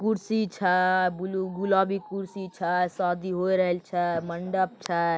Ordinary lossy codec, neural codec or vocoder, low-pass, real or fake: none; none; none; real